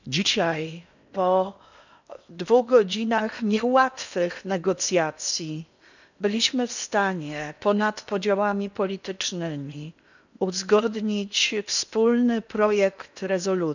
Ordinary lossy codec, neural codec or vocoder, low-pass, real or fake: none; codec, 16 kHz in and 24 kHz out, 0.8 kbps, FocalCodec, streaming, 65536 codes; 7.2 kHz; fake